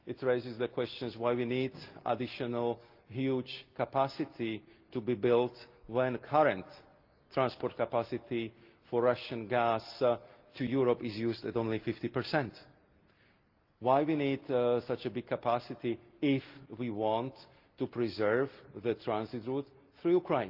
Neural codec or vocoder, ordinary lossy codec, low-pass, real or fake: none; Opus, 32 kbps; 5.4 kHz; real